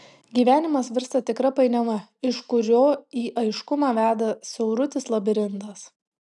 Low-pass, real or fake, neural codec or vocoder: 10.8 kHz; real; none